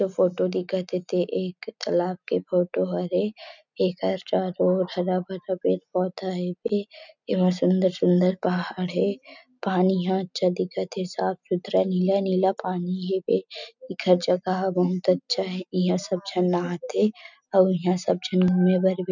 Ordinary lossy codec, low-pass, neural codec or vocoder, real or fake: none; 7.2 kHz; none; real